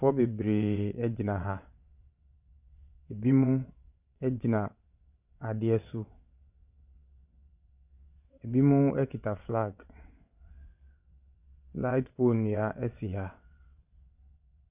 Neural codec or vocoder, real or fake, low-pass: vocoder, 22.05 kHz, 80 mel bands, WaveNeXt; fake; 3.6 kHz